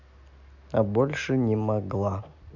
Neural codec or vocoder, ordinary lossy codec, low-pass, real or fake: none; none; 7.2 kHz; real